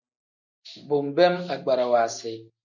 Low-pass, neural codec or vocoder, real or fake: 7.2 kHz; none; real